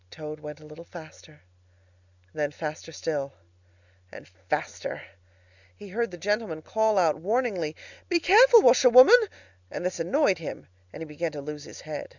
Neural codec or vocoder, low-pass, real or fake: none; 7.2 kHz; real